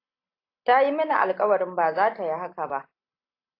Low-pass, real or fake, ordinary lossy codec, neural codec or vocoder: 5.4 kHz; real; AAC, 32 kbps; none